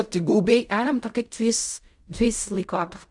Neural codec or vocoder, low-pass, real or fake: codec, 16 kHz in and 24 kHz out, 0.4 kbps, LongCat-Audio-Codec, fine tuned four codebook decoder; 10.8 kHz; fake